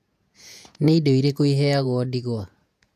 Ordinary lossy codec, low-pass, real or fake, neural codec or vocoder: AAC, 96 kbps; 14.4 kHz; fake; vocoder, 48 kHz, 128 mel bands, Vocos